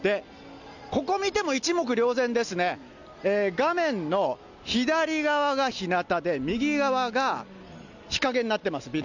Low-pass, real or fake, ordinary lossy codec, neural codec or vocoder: 7.2 kHz; real; none; none